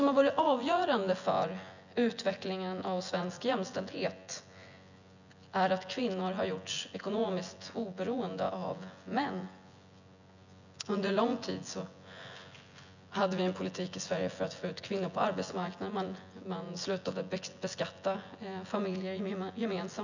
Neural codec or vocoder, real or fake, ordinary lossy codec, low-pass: vocoder, 24 kHz, 100 mel bands, Vocos; fake; none; 7.2 kHz